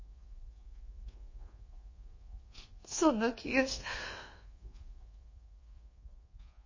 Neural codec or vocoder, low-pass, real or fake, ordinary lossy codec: codec, 24 kHz, 1.2 kbps, DualCodec; 7.2 kHz; fake; MP3, 32 kbps